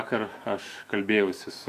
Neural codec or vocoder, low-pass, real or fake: autoencoder, 48 kHz, 128 numbers a frame, DAC-VAE, trained on Japanese speech; 14.4 kHz; fake